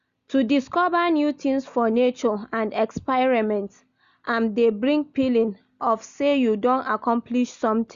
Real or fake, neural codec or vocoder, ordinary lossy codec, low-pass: real; none; Opus, 64 kbps; 7.2 kHz